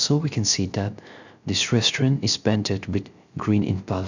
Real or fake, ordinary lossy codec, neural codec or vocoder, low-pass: fake; none; codec, 16 kHz, 0.3 kbps, FocalCodec; 7.2 kHz